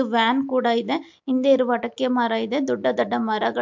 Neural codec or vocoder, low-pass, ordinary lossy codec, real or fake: none; 7.2 kHz; MP3, 64 kbps; real